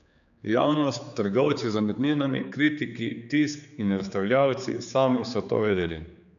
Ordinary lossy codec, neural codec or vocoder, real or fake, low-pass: none; codec, 16 kHz, 4 kbps, X-Codec, HuBERT features, trained on general audio; fake; 7.2 kHz